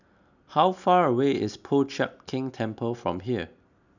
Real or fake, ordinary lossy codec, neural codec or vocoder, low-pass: real; none; none; 7.2 kHz